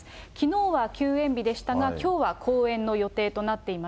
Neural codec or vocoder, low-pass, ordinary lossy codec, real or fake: none; none; none; real